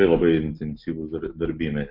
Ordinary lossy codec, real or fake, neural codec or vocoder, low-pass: MP3, 48 kbps; real; none; 5.4 kHz